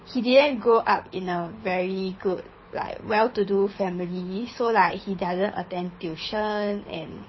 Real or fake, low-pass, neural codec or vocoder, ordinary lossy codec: fake; 7.2 kHz; codec, 24 kHz, 6 kbps, HILCodec; MP3, 24 kbps